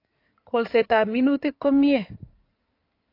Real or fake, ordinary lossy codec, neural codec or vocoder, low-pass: fake; AAC, 32 kbps; codec, 16 kHz in and 24 kHz out, 2.2 kbps, FireRedTTS-2 codec; 5.4 kHz